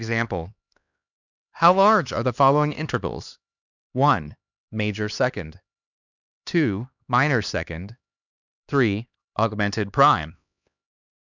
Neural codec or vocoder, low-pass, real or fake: codec, 16 kHz, 2 kbps, X-Codec, HuBERT features, trained on LibriSpeech; 7.2 kHz; fake